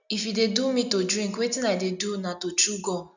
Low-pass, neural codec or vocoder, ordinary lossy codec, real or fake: 7.2 kHz; none; none; real